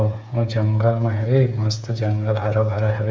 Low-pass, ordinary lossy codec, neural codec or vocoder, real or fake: none; none; codec, 16 kHz, 8 kbps, FreqCodec, smaller model; fake